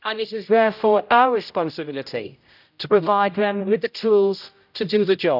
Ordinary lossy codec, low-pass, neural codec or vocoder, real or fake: none; 5.4 kHz; codec, 16 kHz, 0.5 kbps, X-Codec, HuBERT features, trained on general audio; fake